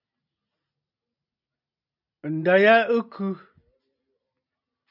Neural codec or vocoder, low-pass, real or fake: none; 5.4 kHz; real